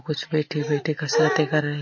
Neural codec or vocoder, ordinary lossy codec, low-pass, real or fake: none; MP3, 32 kbps; 7.2 kHz; real